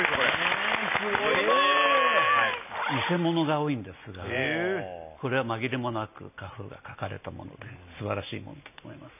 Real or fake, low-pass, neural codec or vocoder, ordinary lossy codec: real; 3.6 kHz; none; none